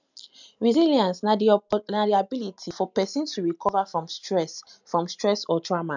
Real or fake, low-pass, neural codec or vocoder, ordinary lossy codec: real; 7.2 kHz; none; none